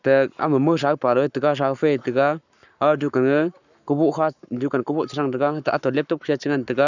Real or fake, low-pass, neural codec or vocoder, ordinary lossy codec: fake; 7.2 kHz; codec, 44.1 kHz, 7.8 kbps, Pupu-Codec; none